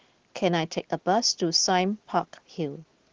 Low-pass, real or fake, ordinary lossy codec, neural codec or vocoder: 7.2 kHz; real; Opus, 16 kbps; none